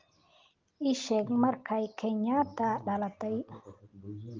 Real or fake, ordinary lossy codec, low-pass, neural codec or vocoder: real; Opus, 32 kbps; 7.2 kHz; none